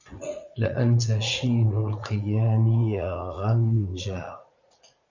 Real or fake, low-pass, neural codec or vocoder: fake; 7.2 kHz; vocoder, 44.1 kHz, 80 mel bands, Vocos